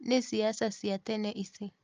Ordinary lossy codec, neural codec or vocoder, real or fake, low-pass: Opus, 24 kbps; none; real; 7.2 kHz